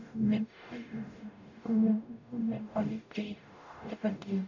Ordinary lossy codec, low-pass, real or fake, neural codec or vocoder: none; 7.2 kHz; fake; codec, 44.1 kHz, 0.9 kbps, DAC